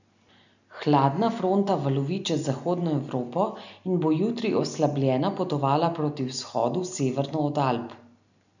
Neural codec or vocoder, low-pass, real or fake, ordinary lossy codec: none; 7.2 kHz; real; none